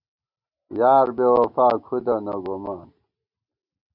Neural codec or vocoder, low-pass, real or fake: none; 5.4 kHz; real